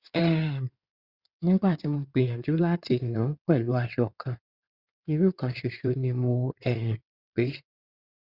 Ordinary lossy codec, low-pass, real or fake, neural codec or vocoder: Opus, 64 kbps; 5.4 kHz; fake; codec, 16 kHz in and 24 kHz out, 2.2 kbps, FireRedTTS-2 codec